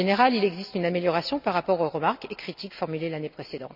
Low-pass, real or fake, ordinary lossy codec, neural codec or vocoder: 5.4 kHz; real; none; none